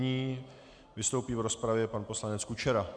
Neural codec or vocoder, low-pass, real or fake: none; 9.9 kHz; real